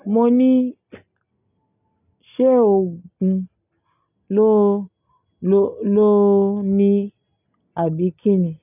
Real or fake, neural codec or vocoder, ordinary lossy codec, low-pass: real; none; none; 3.6 kHz